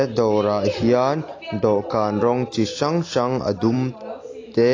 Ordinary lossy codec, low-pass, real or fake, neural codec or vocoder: AAC, 32 kbps; 7.2 kHz; real; none